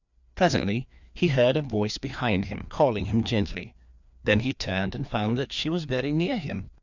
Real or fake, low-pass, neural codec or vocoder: fake; 7.2 kHz; codec, 16 kHz, 2 kbps, FreqCodec, larger model